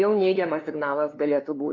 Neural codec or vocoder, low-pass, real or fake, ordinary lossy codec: codec, 16 kHz, 2 kbps, FunCodec, trained on LibriTTS, 25 frames a second; 7.2 kHz; fake; AAC, 32 kbps